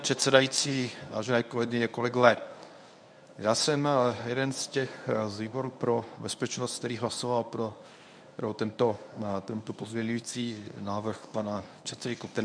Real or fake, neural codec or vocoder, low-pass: fake; codec, 24 kHz, 0.9 kbps, WavTokenizer, medium speech release version 1; 9.9 kHz